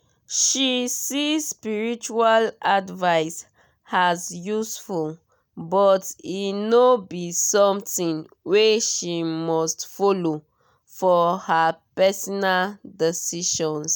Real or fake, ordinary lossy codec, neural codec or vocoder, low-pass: real; none; none; none